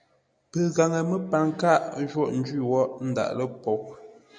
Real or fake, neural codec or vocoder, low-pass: real; none; 9.9 kHz